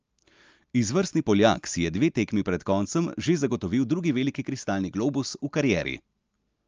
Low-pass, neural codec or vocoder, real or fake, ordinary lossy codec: 7.2 kHz; none; real; Opus, 24 kbps